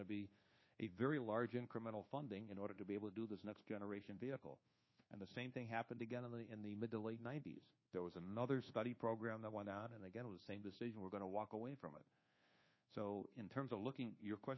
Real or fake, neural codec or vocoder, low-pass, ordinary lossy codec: fake; codec, 24 kHz, 1.2 kbps, DualCodec; 7.2 kHz; MP3, 24 kbps